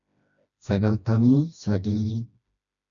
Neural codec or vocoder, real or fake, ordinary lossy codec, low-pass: codec, 16 kHz, 1 kbps, FreqCodec, smaller model; fake; MP3, 96 kbps; 7.2 kHz